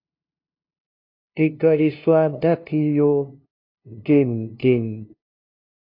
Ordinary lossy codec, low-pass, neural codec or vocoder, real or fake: AAC, 32 kbps; 5.4 kHz; codec, 16 kHz, 0.5 kbps, FunCodec, trained on LibriTTS, 25 frames a second; fake